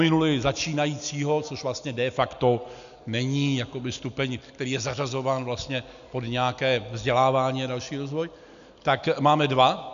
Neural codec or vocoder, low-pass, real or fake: none; 7.2 kHz; real